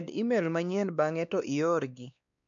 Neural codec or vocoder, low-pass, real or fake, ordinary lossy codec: codec, 16 kHz, 2 kbps, X-Codec, WavLM features, trained on Multilingual LibriSpeech; 7.2 kHz; fake; none